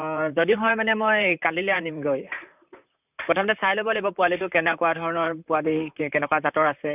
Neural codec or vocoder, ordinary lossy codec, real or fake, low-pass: vocoder, 44.1 kHz, 128 mel bands, Pupu-Vocoder; none; fake; 3.6 kHz